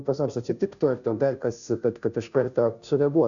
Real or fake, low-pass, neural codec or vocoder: fake; 7.2 kHz; codec, 16 kHz, 0.5 kbps, FunCodec, trained on Chinese and English, 25 frames a second